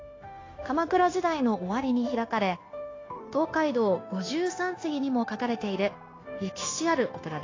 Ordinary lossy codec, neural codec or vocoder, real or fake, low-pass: AAC, 32 kbps; codec, 16 kHz, 0.9 kbps, LongCat-Audio-Codec; fake; 7.2 kHz